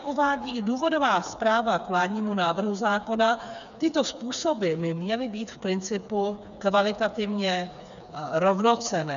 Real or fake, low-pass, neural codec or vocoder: fake; 7.2 kHz; codec, 16 kHz, 4 kbps, FreqCodec, smaller model